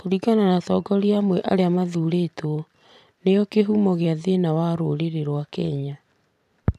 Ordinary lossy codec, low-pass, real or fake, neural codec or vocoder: none; 14.4 kHz; fake; vocoder, 44.1 kHz, 128 mel bands every 512 samples, BigVGAN v2